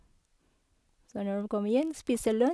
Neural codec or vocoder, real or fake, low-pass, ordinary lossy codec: none; real; none; none